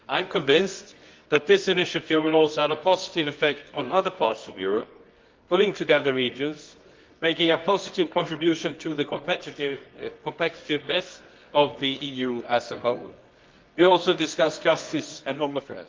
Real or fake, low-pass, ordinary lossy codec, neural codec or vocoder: fake; 7.2 kHz; Opus, 32 kbps; codec, 24 kHz, 0.9 kbps, WavTokenizer, medium music audio release